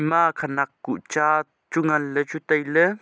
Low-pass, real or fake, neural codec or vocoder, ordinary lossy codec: none; real; none; none